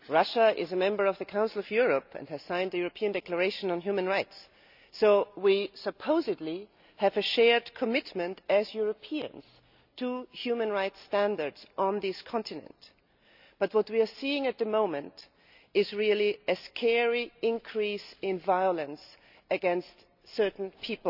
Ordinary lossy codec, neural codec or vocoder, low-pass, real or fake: none; none; 5.4 kHz; real